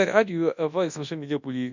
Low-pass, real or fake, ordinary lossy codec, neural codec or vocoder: 7.2 kHz; fake; none; codec, 24 kHz, 0.9 kbps, WavTokenizer, large speech release